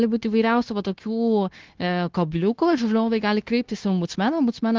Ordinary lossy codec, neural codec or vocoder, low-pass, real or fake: Opus, 16 kbps; codec, 16 kHz, 0.9 kbps, LongCat-Audio-Codec; 7.2 kHz; fake